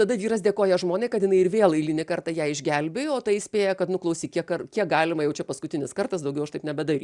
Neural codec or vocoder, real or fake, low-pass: none; real; 10.8 kHz